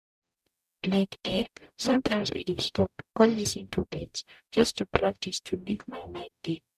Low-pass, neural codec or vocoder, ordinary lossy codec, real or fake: 14.4 kHz; codec, 44.1 kHz, 0.9 kbps, DAC; none; fake